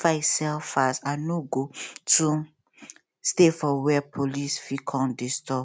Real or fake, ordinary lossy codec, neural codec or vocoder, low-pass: real; none; none; none